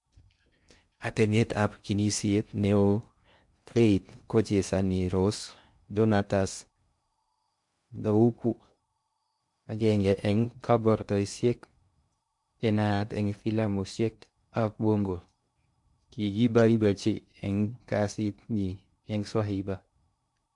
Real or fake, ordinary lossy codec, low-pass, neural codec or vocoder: fake; MP3, 64 kbps; 10.8 kHz; codec, 16 kHz in and 24 kHz out, 0.6 kbps, FocalCodec, streaming, 2048 codes